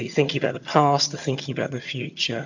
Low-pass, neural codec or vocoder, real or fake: 7.2 kHz; vocoder, 22.05 kHz, 80 mel bands, HiFi-GAN; fake